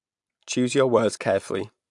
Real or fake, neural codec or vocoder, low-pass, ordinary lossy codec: real; none; 10.8 kHz; AAC, 64 kbps